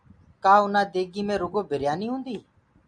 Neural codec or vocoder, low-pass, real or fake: none; 9.9 kHz; real